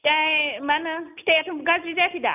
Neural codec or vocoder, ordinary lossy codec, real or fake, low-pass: none; none; real; 3.6 kHz